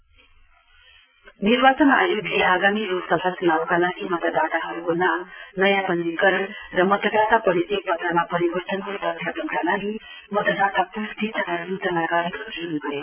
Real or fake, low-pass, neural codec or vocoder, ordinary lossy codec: fake; 3.6 kHz; vocoder, 22.05 kHz, 80 mel bands, Vocos; none